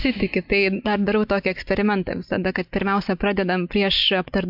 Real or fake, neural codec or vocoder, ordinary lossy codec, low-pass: real; none; MP3, 48 kbps; 5.4 kHz